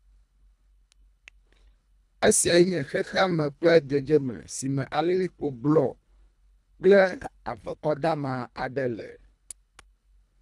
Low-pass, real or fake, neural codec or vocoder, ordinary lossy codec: none; fake; codec, 24 kHz, 1.5 kbps, HILCodec; none